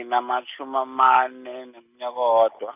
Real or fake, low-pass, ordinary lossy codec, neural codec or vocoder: real; 3.6 kHz; none; none